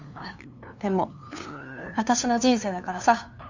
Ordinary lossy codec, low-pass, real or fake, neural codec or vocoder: Opus, 64 kbps; 7.2 kHz; fake; codec, 16 kHz, 2 kbps, FunCodec, trained on LibriTTS, 25 frames a second